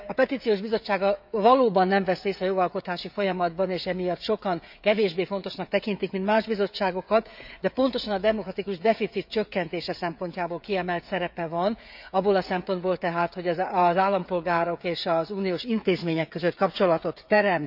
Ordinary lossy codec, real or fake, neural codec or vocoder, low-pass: none; fake; codec, 16 kHz, 16 kbps, FreqCodec, smaller model; 5.4 kHz